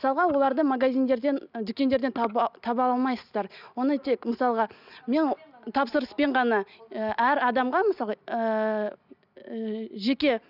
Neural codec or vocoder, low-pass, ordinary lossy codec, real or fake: none; 5.4 kHz; none; real